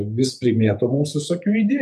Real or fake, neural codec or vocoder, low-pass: fake; vocoder, 48 kHz, 128 mel bands, Vocos; 14.4 kHz